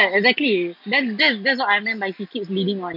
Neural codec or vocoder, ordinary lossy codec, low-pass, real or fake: none; AAC, 48 kbps; 5.4 kHz; real